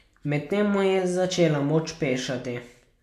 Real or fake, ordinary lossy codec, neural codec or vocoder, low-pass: real; none; none; 14.4 kHz